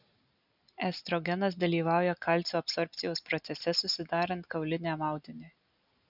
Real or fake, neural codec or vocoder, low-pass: real; none; 5.4 kHz